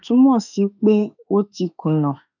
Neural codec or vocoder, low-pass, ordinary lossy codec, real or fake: autoencoder, 48 kHz, 32 numbers a frame, DAC-VAE, trained on Japanese speech; 7.2 kHz; none; fake